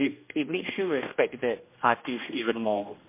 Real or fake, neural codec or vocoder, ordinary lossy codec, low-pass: fake; codec, 16 kHz, 1 kbps, X-Codec, HuBERT features, trained on general audio; MP3, 24 kbps; 3.6 kHz